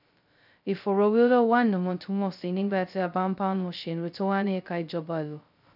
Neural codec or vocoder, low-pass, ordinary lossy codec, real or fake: codec, 16 kHz, 0.2 kbps, FocalCodec; 5.4 kHz; none; fake